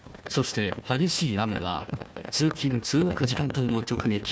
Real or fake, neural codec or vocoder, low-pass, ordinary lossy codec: fake; codec, 16 kHz, 1 kbps, FunCodec, trained on Chinese and English, 50 frames a second; none; none